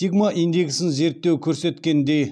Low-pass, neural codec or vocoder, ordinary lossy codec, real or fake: none; none; none; real